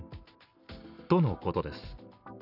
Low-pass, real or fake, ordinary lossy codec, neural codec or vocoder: 5.4 kHz; real; none; none